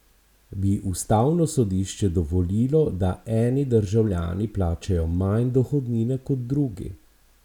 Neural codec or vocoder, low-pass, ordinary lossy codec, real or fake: none; 19.8 kHz; none; real